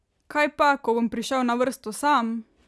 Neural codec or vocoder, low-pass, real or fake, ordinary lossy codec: none; none; real; none